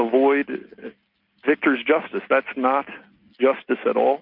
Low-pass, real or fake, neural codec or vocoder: 5.4 kHz; real; none